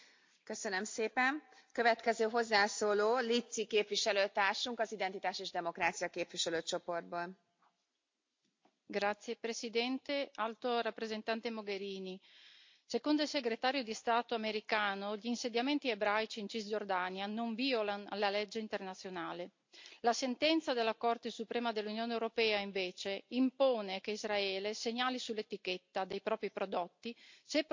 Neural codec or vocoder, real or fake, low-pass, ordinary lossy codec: none; real; 7.2 kHz; MP3, 48 kbps